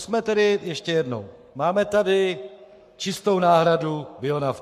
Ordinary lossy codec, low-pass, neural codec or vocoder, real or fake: MP3, 64 kbps; 14.4 kHz; codec, 44.1 kHz, 7.8 kbps, Pupu-Codec; fake